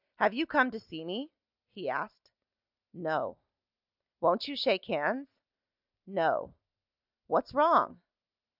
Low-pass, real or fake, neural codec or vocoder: 5.4 kHz; real; none